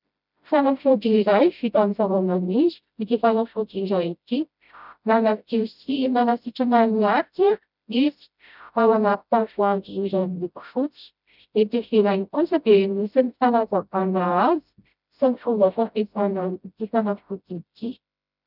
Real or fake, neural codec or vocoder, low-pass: fake; codec, 16 kHz, 0.5 kbps, FreqCodec, smaller model; 5.4 kHz